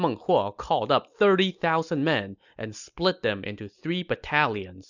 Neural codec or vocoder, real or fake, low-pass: none; real; 7.2 kHz